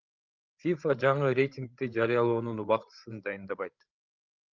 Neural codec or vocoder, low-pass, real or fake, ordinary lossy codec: codec, 16 kHz, 8 kbps, FreqCodec, larger model; 7.2 kHz; fake; Opus, 24 kbps